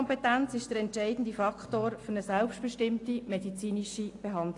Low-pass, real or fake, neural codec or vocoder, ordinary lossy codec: 10.8 kHz; real; none; AAC, 48 kbps